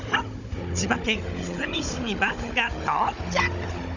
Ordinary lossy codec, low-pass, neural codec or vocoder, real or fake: none; 7.2 kHz; codec, 16 kHz, 16 kbps, FunCodec, trained on Chinese and English, 50 frames a second; fake